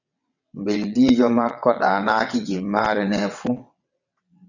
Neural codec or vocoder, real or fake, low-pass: vocoder, 22.05 kHz, 80 mel bands, WaveNeXt; fake; 7.2 kHz